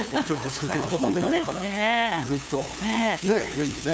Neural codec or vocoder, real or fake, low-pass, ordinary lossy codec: codec, 16 kHz, 2 kbps, FunCodec, trained on LibriTTS, 25 frames a second; fake; none; none